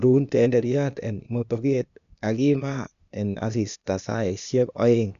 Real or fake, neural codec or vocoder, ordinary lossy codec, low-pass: fake; codec, 16 kHz, 0.8 kbps, ZipCodec; none; 7.2 kHz